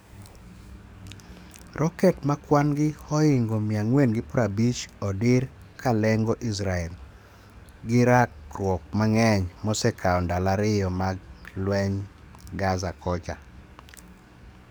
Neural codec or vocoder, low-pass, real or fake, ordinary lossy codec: codec, 44.1 kHz, 7.8 kbps, DAC; none; fake; none